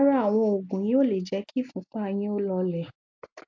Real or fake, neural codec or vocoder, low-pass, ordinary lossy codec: real; none; 7.2 kHz; MP3, 48 kbps